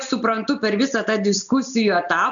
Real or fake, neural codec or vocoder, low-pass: real; none; 7.2 kHz